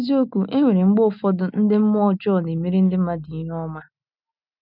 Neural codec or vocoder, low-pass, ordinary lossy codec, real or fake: none; 5.4 kHz; none; real